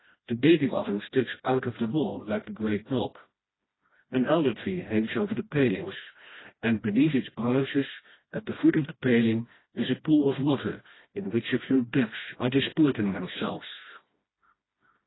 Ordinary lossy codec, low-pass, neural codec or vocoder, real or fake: AAC, 16 kbps; 7.2 kHz; codec, 16 kHz, 1 kbps, FreqCodec, smaller model; fake